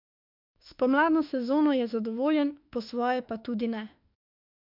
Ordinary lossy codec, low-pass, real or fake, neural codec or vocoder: MP3, 48 kbps; 5.4 kHz; fake; autoencoder, 48 kHz, 32 numbers a frame, DAC-VAE, trained on Japanese speech